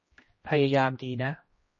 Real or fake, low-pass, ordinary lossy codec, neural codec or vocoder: fake; 7.2 kHz; MP3, 32 kbps; codec, 16 kHz, 1 kbps, X-Codec, HuBERT features, trained on general audio